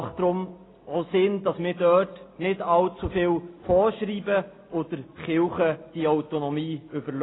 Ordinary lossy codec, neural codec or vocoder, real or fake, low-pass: AAC, 16 kbps; none; real; 7.2 kHz